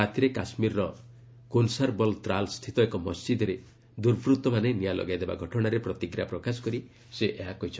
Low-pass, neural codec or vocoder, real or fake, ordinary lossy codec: none; none; real; none